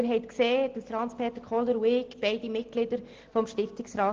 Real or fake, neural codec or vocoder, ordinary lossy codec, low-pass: real; none; Opus, 16 kbps; 7.2 kHz